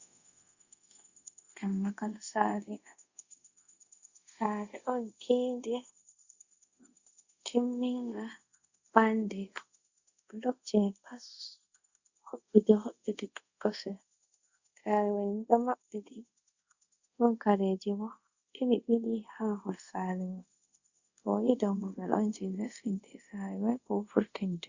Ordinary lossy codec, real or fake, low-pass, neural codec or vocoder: Opus, 64 kbps; fake; 7.2 kHz; codec, 24 kHz, 0.5 kbps, DualCodec